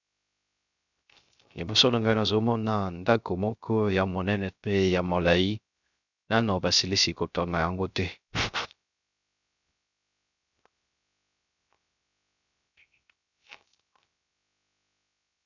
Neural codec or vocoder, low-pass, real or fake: codec, 16 kHz, 0.3 kbps, FocalCodec; 7.2 kHz; fake